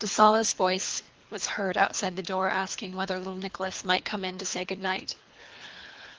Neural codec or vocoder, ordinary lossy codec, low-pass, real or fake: codec, 24 kHz, 3 kbps, HILCodec; Opus, 24 kbps; 7.2 kHz; fake